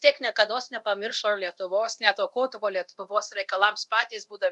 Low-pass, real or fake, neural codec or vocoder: 10.8 kHz; fake; codec, 24 kHz, 0.9 kbps, DualCodec